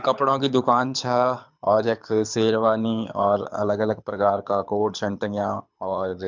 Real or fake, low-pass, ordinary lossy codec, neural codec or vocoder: fake; 7.2 kHz; MP3, 64 kbps; codec, 24 kHz, 6 kbps, HILCodec